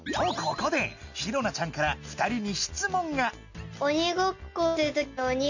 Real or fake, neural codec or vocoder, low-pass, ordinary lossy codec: real; none; 7.2 kHz; none